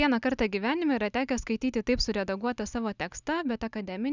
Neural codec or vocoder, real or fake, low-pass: none; real; 7.2 kHz